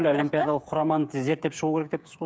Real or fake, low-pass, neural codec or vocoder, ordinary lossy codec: fake; none; codec, 16 kHz, 8 kbps, FreqCodec, smaller model; none